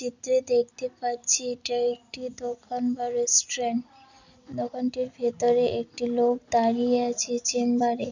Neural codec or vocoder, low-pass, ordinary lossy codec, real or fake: none; 7.2 kHz; none; real